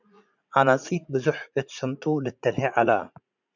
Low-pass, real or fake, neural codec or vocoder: 7.2 kHz; fake; vocoder, 44.1 kHz, 80 mel bands, Vocos